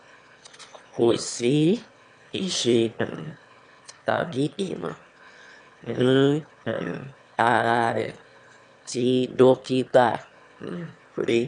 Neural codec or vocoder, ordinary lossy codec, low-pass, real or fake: autoencoder, 22.05 kHz, a latent of 192 numbers a frame, VITS, trained on one speaker; none; 9.9 kHz; fake